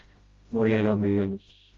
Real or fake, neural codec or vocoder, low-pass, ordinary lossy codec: fake; codec, 16 kHz, 0.5 kbps, FreqCodec, smaller model; 7.2 kHz; Opus, 32 kbps